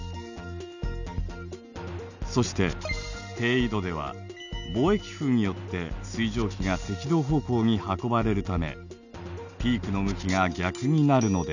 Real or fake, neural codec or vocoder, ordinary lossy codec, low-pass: real; none; none; 7.2 kHz